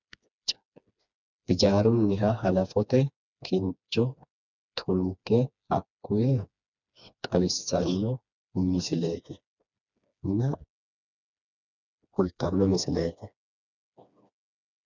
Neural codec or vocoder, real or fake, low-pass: codec, 16 kHz, 2 kbps, FreqCodec, smaller model; fake; 7.2 kHz